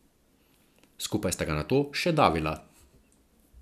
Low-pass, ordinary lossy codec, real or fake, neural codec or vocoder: 14.4 kHz; none; real; none